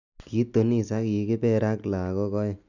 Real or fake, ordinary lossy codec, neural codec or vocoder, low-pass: real; none; none; 7.2 kHz